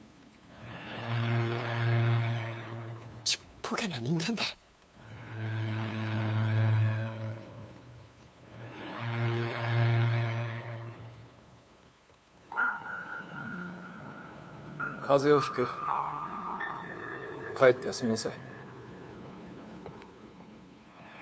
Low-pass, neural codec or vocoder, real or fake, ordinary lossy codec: none; codec, 16 kHz, 2 kbps, FunCodec, trained on LibriTTS, 25 frames a second; fake; none